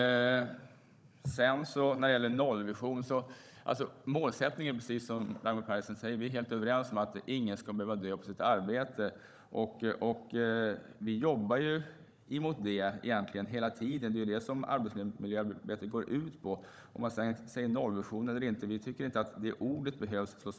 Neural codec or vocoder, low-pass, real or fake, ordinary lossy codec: codec, 16 kHz, 16 kbps, FunCodec, trained on Chinese and English, 50 frames a second; none; fake; none